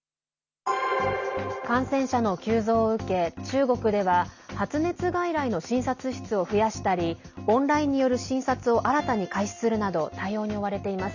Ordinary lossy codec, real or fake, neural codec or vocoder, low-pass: none; real; none; 7.2 kHz